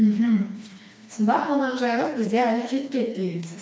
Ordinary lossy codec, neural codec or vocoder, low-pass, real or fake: none; codec, 16 kHz, 2 kbps, FreqCodec, smaller model; none; fake